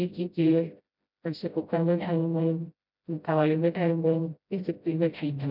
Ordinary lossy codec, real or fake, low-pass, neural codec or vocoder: none; fake; 5.4 kHz; codec, 16 kHz, 0.5 kbps, FreqCodec, smaller model